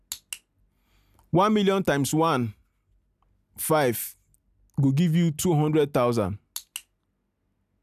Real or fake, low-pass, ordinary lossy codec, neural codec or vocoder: real; 14.4 kHz; none; none